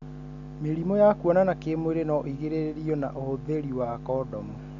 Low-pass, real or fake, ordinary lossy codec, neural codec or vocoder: 7.2 kHz; real; none; none